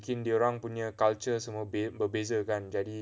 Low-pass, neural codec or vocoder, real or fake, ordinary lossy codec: none; none; real; none